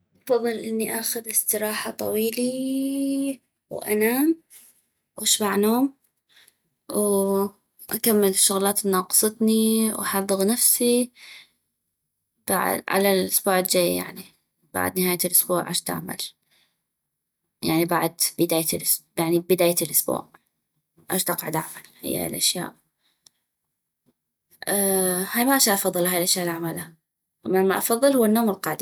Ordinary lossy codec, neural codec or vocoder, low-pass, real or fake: none; none; none; real